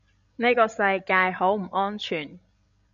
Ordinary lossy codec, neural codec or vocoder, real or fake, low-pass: MP3, 64 kbps; codec, 16 kHz, 16 kbps, FreqCodec, larger model; fake; 7.2 kHz